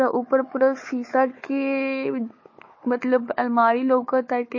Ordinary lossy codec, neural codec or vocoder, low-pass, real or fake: MP3, 32 kbps; codec, 16 kHz, 4 kbps, FunCodec, trained on Chinese and English, 50 frames a second; 7.2 kHz; fake